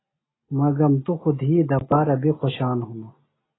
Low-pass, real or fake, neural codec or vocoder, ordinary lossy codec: 7.2 kHz; real; none; AAC, 16 kbps